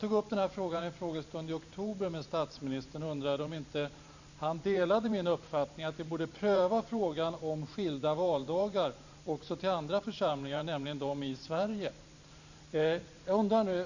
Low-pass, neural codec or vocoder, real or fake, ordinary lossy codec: 7.2 kHz; vocoder, 44.1 kHz, 128 mel bands every 512 samples, BigVGAN v2; fake; none